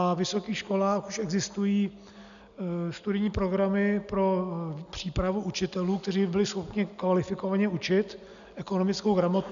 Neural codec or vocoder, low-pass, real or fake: none; 7.2 kHz; real